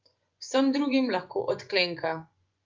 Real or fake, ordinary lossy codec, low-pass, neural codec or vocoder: fake; Opus, 24 kbps; 7.2 kHz; vocoder, 24 kHz, 100 mel bands, Vocos